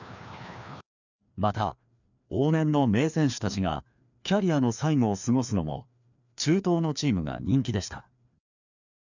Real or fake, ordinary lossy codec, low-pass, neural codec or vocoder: fake; none; 7.2 kHz; codec, 16 kHz, 2 kbps, FreqCodec, larger model